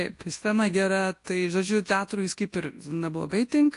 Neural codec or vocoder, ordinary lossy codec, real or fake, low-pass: codec, 24 kHz, 0.9 kbps, WavTokenizer, large speech release; AAC, 48 kbps; fake; 10.8 kHz